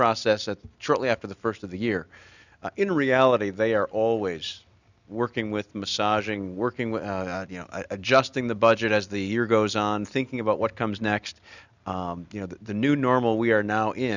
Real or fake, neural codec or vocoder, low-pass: real; none; 7.2 kHz